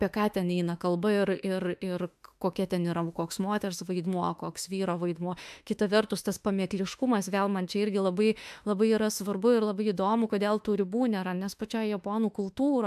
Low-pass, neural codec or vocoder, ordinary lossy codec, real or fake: 14.4 kHz; autoencoder, 48 kHz, 32 numbers a frame, DAC-VAE, trained on Japanese speech; AAC, 96 kbps; fake